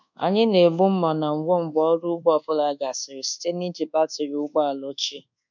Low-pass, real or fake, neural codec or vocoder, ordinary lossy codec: 7.2 kHz; fake; codec, 24 kHz, 1.2 kbps, DualCodec; none